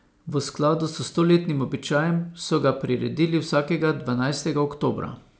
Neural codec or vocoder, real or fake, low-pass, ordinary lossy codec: none; real; none; none